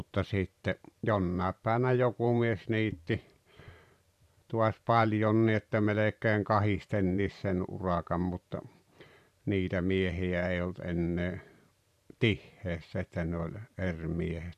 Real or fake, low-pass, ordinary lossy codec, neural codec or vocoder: fake; 14.4 kHz; none; vocoder, 44.1 kHz, 128 mel bands every 512 samples, BigVGAN v2